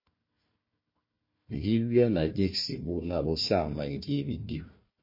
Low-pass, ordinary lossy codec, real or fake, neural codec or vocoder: 5.4 kHz; MP3, 24 kbps; fake; codec, 16 kHz, 1 kbps, FunCodec, trained on Chinese and English, 50 frames a second